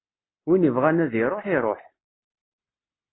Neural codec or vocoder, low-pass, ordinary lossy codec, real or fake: none; 7.2 kHz; AAC, 16 kbps; real